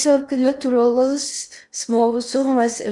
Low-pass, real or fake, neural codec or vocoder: 10.8 kHz; fake; codec, 16 kHz in and 24 kHz out, 0.6 kbps, FocalCodec, streaming, 4096 codes